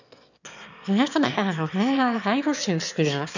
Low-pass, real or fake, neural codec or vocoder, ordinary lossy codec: 7.2 kHz; fake; autoencoder, 22.05 kHz, a latent of 192 numbers a frame, VITS, trained on one speaker; none